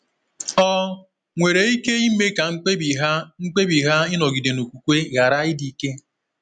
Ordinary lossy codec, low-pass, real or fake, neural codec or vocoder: none; 9.9 kHz; real; none